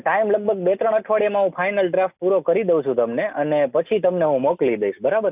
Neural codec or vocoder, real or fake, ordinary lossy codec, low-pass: none; real; none; 3.6 kHz